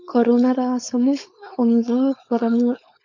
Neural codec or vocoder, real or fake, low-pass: codec, 16 kHz, 4.8 kbps, FACodec; fake; 7.2 kHz